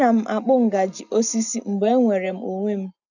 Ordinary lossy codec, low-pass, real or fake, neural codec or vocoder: none; 7.2 kHz; real; none